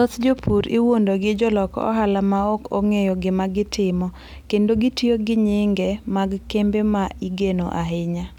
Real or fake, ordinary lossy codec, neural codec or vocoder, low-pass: real; none; none; 19.8 kHz